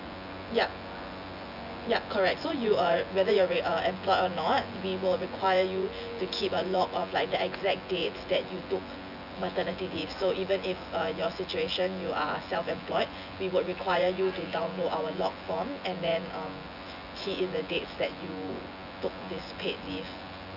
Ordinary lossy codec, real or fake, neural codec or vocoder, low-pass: none; fake; vocoder, 24 kHz, 100 mel bands, Vocos; 5.4 kHz